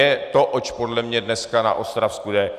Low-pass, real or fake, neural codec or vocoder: 14.4 kHz; real; none